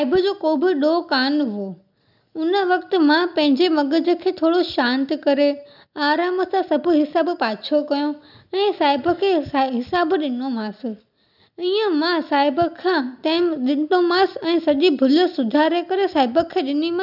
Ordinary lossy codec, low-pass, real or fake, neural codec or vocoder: none; 5.4 kHz; real; none